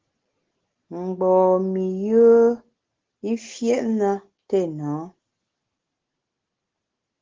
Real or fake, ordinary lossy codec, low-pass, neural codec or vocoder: real; Opus, 16 kbps; 7.2 kHz; none